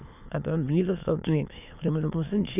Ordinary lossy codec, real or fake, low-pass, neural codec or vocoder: none; fake; 3.6 kHz; autoencoder, 22.05 kHz, a latent of 192 numbers a frame, VITS, trained on many speakers